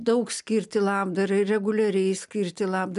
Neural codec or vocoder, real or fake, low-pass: none; real; 10.8 kHz